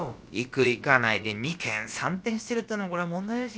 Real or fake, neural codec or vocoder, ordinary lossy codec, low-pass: fake; codec, 16 kHz, about 1 kbps, DyCAST, with the encoder's durations; none; none